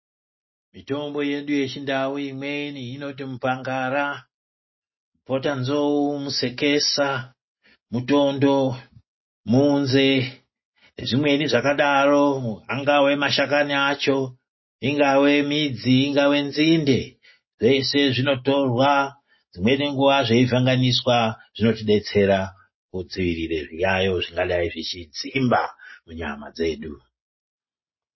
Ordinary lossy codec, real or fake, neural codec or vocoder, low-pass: MP3, 24 kbps; real; none; 7.2 kHz